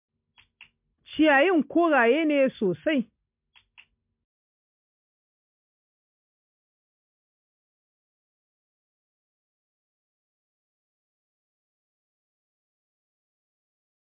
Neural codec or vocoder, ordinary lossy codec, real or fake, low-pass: none; MP3, 32 kbps; real; 3.6 kHz